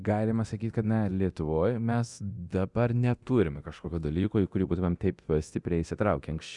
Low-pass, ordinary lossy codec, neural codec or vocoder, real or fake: 10.8 kHz; Opus, 64 kbps; codec, 24 kHz, 0.9 kbps, DualCodec; fake